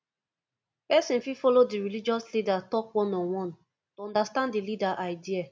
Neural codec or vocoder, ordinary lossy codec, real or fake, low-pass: none; none; real; none